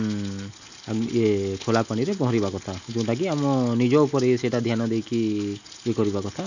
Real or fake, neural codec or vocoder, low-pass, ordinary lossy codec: real; none; 7.2 kHz; none